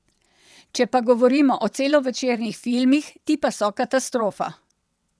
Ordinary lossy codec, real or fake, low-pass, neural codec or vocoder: none; fake; none; vocoder, 22.05 kHz, 80 mel bands, WaveNeXt